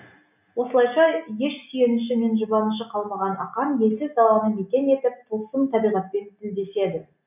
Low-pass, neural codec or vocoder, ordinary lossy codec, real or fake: 3.6 kHz; none; none; real